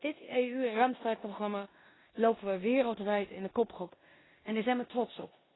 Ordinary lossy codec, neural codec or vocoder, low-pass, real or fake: AAC, 16 kbps; codec, 16 kHz in and 24 kHz out, 0.9 kbps, LongCat-Audio-Codec, four codebook decoder; 7.2 kHz; fake